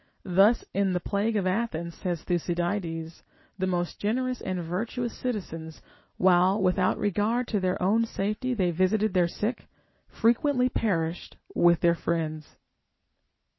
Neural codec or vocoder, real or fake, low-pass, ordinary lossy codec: none; real; 7.2 kHz; MP3, 24 kbps